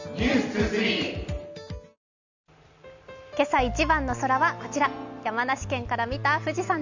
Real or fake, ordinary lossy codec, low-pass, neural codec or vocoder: real; none; 7.2 kHz; none